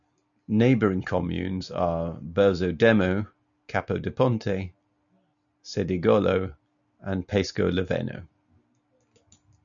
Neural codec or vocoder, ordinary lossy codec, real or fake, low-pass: none; MP3, 96 kbps; real; 7.2 kHz